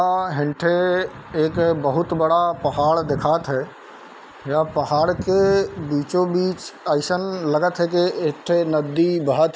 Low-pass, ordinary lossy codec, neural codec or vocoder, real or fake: none; none; none; real